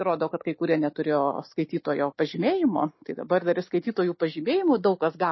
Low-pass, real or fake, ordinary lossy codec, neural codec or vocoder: 7.2 kHz; real; MP3, 24 kbps; none